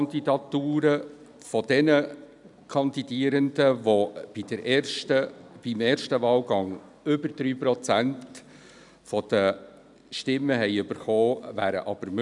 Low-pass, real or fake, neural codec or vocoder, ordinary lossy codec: 10.8 kHz; real; none; none